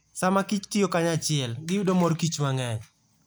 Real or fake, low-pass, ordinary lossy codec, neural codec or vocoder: real; none; none; none